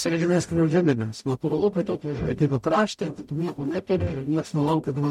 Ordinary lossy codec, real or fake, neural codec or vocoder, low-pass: MP3, 64 kbps; fake; codec, 44.1 kHz, 0.9 kbps, DAC; 19.8 kHz